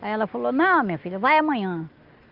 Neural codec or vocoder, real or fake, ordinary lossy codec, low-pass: none; real; Opus, 32 kbps; 5.4 kHz